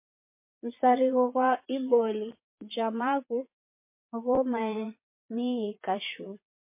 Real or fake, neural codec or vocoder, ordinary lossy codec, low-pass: fake; vocoder, 22.05 kHz, 80 mel bands, Vocos; MP3, 24 kbps; 3.6 kHz